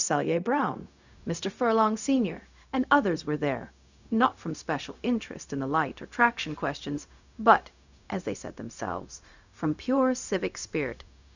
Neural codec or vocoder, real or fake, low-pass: codec, 16 kHz, 0.4 kbps, LongCat-Audio-Codec; fake; 7.2 kHz